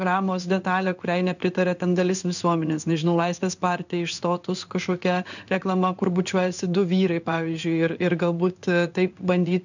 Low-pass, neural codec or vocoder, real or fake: 7.2 kHz; codec, 16 kHz in and 24 kHz out, 1 kbps, XY-Tokenizer; fake